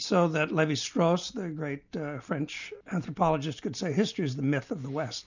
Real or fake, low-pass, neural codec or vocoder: real; 7.2 kHz; none